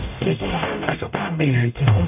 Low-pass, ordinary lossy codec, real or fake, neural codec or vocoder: 3.6 kHz; none; fake; codec, 44.1 kHz, 0.9 kbps, DAC